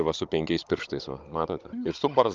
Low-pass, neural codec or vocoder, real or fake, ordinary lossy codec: 7.2 kHz; codec, 16 kHz, 16 kbps, FunCodec, trained on Chinese and English, 50 frames a second; fake; Opus, 32 kbps